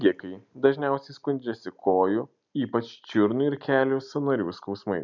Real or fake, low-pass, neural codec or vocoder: real; 7.2 kHz; none